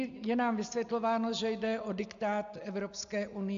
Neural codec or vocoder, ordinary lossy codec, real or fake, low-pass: none; AAC, 64 kbps; real; 7.2 kHz